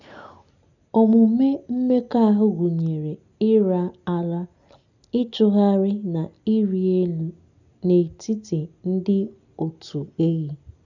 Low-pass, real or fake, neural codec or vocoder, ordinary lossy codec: 7.2 kHz; real; none; none